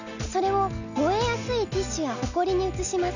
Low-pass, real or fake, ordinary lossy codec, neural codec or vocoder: 7.2 kHz; real; none; none